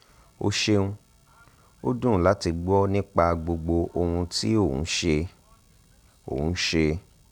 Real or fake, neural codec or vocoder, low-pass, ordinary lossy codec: real; none; 19.8 kHz; none